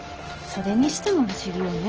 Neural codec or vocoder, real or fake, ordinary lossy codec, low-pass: none; real; Opus, 16 kbps; 7.2 kHz